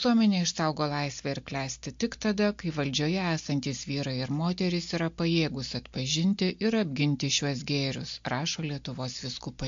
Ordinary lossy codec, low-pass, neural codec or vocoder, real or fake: MP3, 48 kbps; 7.2 kHz; none; real